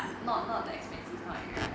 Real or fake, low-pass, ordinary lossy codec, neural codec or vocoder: real; none; none; none